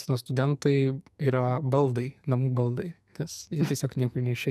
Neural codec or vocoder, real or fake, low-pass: codec, 44.1 kHz, 2.6 kbps, SNAC; fake; 14.4 kHz